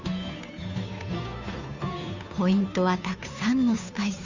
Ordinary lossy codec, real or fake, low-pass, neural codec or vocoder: Opus, 64 kbps; fake; 7.2 kHz; codec, 16 kHz, 2 kbps, FunCodec, trained on Chinese and English, 25 frames a second